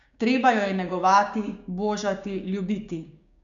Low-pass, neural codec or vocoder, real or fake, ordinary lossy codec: 7.2 kHz; codec, 16 kHz, 6 kbps, DAC; fake; none